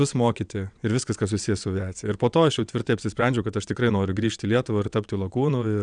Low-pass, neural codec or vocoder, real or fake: 9.9 kHz; vocoder, 22.05 kHz, 80 mel bands, WaveNeXt; fake